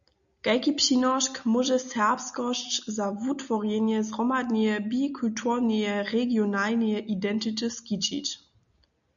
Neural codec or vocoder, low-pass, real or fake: none; 7.2 kHz; real